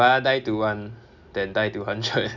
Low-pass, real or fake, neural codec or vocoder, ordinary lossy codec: 7.2 kHz; real; none; none